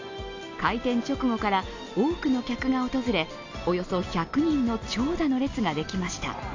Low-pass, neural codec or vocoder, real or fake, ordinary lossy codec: 7.2 kHz; none; real; AAC, 48 kbps